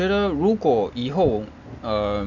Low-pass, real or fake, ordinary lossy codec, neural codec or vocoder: 7.2 kHz; real; none; none